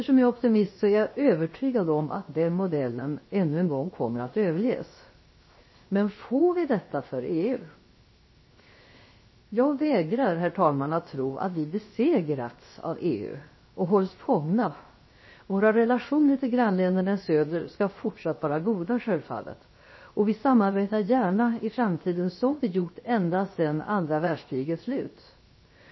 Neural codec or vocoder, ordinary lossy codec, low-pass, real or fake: codec, 16 kHz, 0.7 kbps, FocalCodec; MP3, 24 kbps; 7.2 kHz; fake